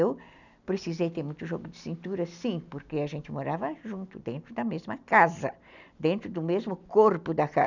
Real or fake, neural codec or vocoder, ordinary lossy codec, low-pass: real; none; none; 7.2 kHz